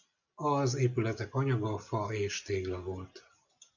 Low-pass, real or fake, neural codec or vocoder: 7.2 kHz; real; none